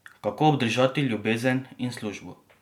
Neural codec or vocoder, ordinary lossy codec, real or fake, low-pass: none; MP3, 96 kbps; real; 19.8 kHz